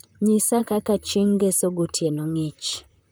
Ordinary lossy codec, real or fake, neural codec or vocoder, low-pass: none; fake; vocoder, 44.1 kHz, 128 mel bands, Pupu-Vocoder; none